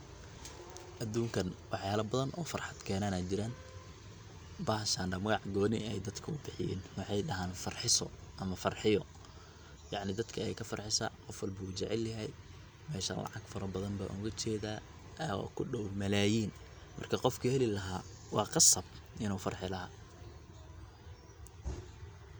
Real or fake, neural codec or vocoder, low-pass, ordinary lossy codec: real; none; none; none